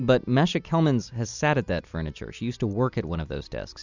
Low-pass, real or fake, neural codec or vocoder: 7.2 kHz; real; none